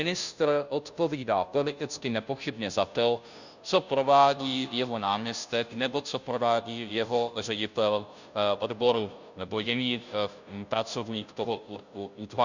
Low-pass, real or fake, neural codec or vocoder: 7.2 kHz; fake; codec, 16 kHz, 0.5 kbps, FunCodec, trained on Chinese and English, 25 frames a second